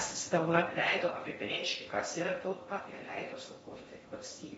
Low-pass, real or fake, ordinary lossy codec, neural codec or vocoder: 10.8 kHz; fake; AAC, 24 kbps; codec, 16 kHz in and 24 kHz out, 0.6 kbps, FocalCodec, streaming, 4096 codes